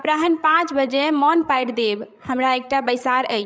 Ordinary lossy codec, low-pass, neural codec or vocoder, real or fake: none; none; codec, 16 kHz, 16 kbps, FreqCodec, larger model; fake